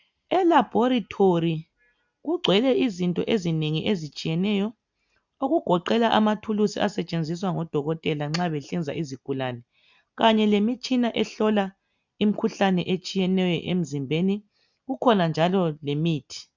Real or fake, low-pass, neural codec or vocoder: real; 7.2 kHz; none